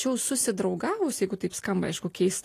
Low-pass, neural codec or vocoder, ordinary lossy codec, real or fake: 14.4 kHz; none; AAC, 48 kbps; real